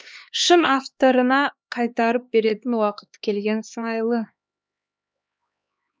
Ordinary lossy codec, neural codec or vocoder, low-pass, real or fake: none; codec, 16 kHz, 2 kbps, X-Codec, HuBERT features, trained on LibriSpeech; none; fake